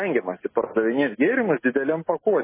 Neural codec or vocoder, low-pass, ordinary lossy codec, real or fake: none; 3.6 kHz; MP3, 16 kbps; real